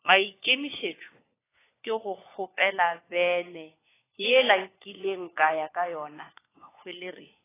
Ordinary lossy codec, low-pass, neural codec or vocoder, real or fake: AAC, 16 kbps; 3.6 kHz; codec, 16 kHz, 4 kbps, FunCodec, trained on LibriTTS, 50 frames a second; fake